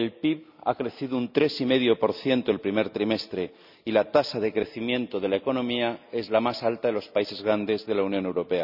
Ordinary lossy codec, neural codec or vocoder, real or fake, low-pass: none; none; real; 5.4 kHz